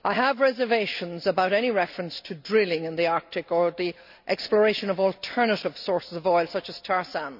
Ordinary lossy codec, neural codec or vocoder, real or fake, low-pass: none; none; real; 5.4 kHz